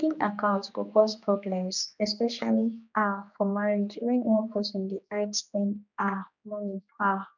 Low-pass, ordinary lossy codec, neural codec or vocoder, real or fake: 7.2 kHz; none; codec, 16 kHz, 2 kbps, X-Codec, HuBERT features, trained on general audio; fake